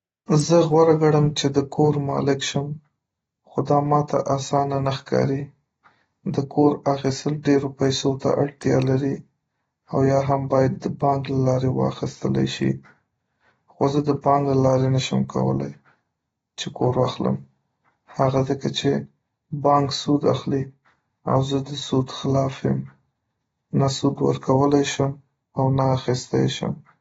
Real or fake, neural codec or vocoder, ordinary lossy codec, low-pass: real; none; AAC, 24 kbps; 10.8 kHz